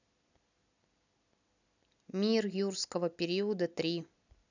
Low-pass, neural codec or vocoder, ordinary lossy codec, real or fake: 7.2 kHz; none; none; real